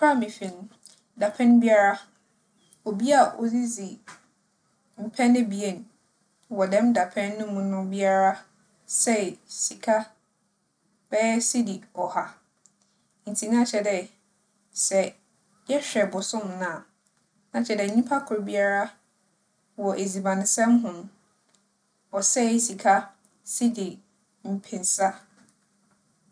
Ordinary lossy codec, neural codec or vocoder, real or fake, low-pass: none; none; real; 9.9 kHz